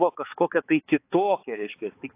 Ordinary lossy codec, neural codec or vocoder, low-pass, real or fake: AAC, 24 kbps; codec, 16 kHz, 4 kbps, X-Codec, HuBERT features, trained on balanced general audio; 3.6 kHz; fake